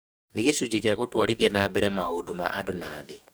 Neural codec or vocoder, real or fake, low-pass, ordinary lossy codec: codec, 44.1 kHz, 2.6 kbps, DAC; fake; none; none